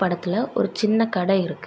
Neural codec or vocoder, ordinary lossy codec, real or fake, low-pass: none; none; real; none